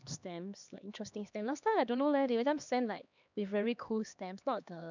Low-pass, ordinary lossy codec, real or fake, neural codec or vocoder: 7.2 kHz; none; fake; codec, 16 kHz, 2 kbps, X-Codec, HuBERT features, trained on LibriSpeech